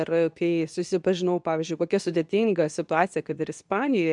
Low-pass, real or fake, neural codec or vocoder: 10.8 kHz; fake; codec, 24 kHz, 0.9 kbps, WavTokenizer, medium speech release version 2